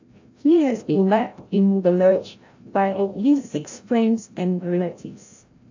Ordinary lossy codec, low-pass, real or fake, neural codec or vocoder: AAC, 48 kbps; 7.2 kHz; fake; codec, 16 kHz, 0.5 kbps, FreqCodec, larger model